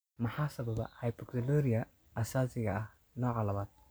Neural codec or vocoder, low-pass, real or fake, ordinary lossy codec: none; none; real; none